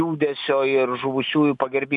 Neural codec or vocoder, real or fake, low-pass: none; real; 10.8 kHz